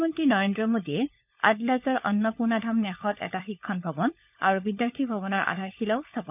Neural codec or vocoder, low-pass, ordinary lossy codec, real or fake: codec, 16 kHz, 16 kbps, FunCodec, trained on LibriTTS, 50 frames a second; 3.6 kHz; none; fake